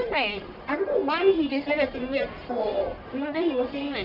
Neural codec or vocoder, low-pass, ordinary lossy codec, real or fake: codec, 44.1 kHz, 1.7 kbps, Pupu-Codec; 5.4 kHz; MP3, 48 kbps; fake